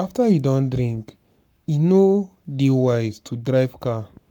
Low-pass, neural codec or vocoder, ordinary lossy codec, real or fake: 19.8 kHz; codec, 44.1 kHz, 7.8 kbps, Pupu-Codec; none; fake